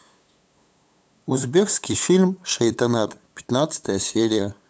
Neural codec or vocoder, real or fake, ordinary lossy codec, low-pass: codec, 16 kHz, 8 kbps, FunCodec, trained on LibriTTS, 25 frames a second; fake; none; none